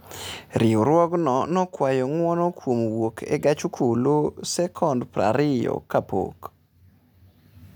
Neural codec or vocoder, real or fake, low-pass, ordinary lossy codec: none; real; none; none